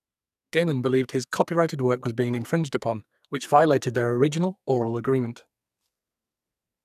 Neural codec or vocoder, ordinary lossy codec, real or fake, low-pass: codec, 44.1 kHz, 2.6 kbps, SNAC; none; fake; 14.4 kHz